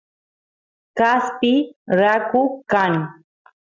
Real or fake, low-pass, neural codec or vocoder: real; 7.2 kHz; none